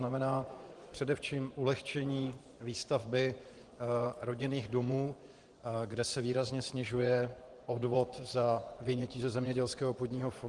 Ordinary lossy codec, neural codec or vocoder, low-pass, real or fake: Opus, 24 kbps; vocoder, 24 kHz, 100 mel bands, Vocos; 10.8 kHz; fake